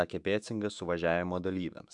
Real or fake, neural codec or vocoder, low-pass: fake; autoencoder, 48 kHz, 128 numbers a frame, DAC-VAE, trained on Japanese speech; 10.8 kHz